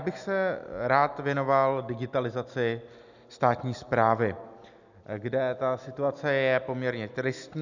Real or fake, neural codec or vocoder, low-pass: real; none; 7.2 kHz